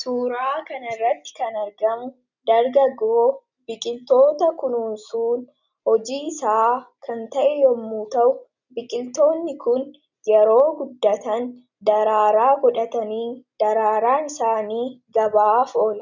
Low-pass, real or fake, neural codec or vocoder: 7.2 kHz; real; none